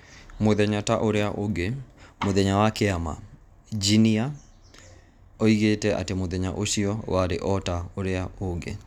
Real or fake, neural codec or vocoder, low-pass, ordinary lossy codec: real; none; 19.8 kHz; none